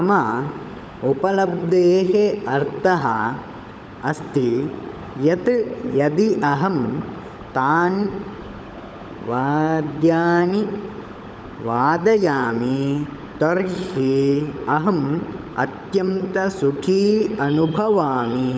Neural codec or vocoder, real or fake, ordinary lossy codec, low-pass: codec, 16 kHz, 16 kbps, FunCodec, trained on LibriTTS, 50 frames a second; fake; none; none